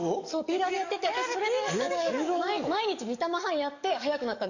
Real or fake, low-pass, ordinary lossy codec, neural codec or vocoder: fake; 7.2 kHz; none; codec, 44.1 kHz, 7.8 kbps, DAC